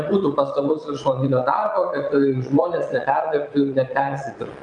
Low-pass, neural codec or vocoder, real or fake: 9.9 kHz; vocoder, 22.05 kHz, 80 mel bands, Vocos; fake